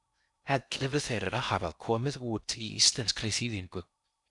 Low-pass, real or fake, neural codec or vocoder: 10.8 kHz; fake; codec, 16 kHz in and 24 kHz out, 0.6 kbps, FocalCodec, streaming, 2048 codes